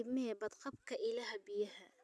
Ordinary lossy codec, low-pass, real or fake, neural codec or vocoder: none; none; real; none